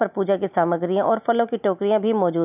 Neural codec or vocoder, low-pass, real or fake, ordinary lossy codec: none; 3.6 kHz; real; none